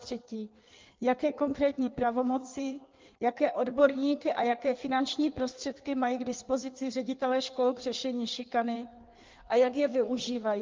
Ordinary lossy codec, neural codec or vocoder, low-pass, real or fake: Opus, 32 kbps; codec, 16 kHz in and 24 kHz out, 1.1 kbps, FireRedTTS-2 codec; 7.2 kHz; fake